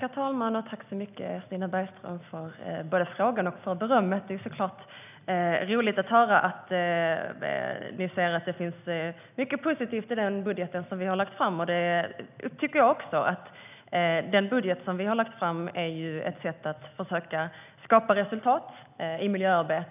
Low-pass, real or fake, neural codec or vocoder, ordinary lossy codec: 3.6 kHz; real; none; none